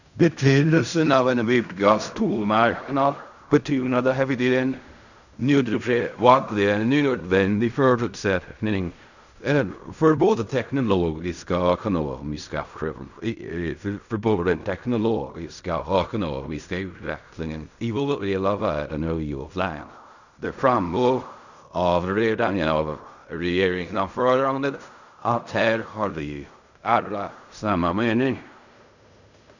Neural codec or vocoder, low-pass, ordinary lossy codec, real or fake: codec, 16 kHz in and 24 kHz out, 0.4 kbps, LongCat-Audio-Codec, fine tuned four codebook decoder; 7.2 kHz; none; fake